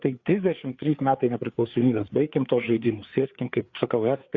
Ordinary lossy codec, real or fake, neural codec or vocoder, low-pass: Opus, 64 kbps; fake; codec, 16 kHz, 4 kbps, FreqCodec, larger model; 7.2 kHz